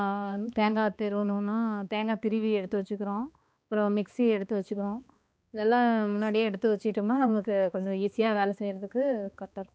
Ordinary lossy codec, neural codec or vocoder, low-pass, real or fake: none; codec, 16 kHz, 2 kbps, X-Codec, HuBERT features, trained on balanced general audio; none; fake